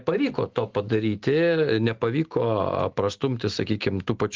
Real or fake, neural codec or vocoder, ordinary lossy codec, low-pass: real; none; Opus, 16 kbps; 7.2 kHz